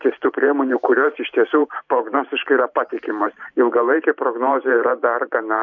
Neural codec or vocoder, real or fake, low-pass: vocoder, 44.1 kHz, 128 mel bands every 512 samples, BigVGAN v2; fake; 7.2 kHz